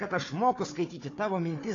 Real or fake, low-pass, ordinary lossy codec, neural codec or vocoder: fake; 7.2 kHz; AAC, 32 kbps; codec, 16 kHz, 4 kbps, FunCodec, trained on Chinese and English, 50 frames a second